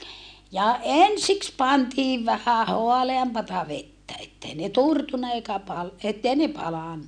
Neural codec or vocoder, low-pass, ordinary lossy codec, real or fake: none; 9.9 kHz; none; real